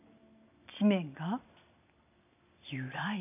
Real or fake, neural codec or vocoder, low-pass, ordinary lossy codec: real; none; 3.6 kHz; none